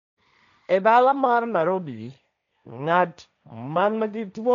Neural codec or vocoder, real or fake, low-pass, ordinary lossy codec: codec, 16 kHz, 1.1 kbps, Voila-Tokenizer; fake; 7.2 kHz; none